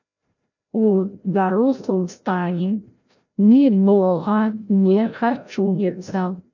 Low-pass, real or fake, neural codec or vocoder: 7.2 kHz; fake; codec, 16 kHz, 0.5 kbps, FreqCodec, larger model